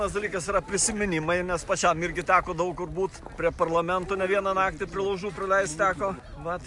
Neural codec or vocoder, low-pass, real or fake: none; 10.8 kHz; real